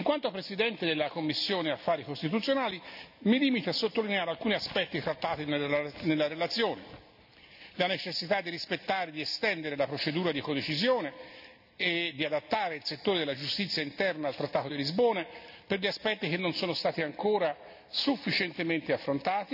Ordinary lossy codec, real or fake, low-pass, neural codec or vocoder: none; real; 5.4 kHz; none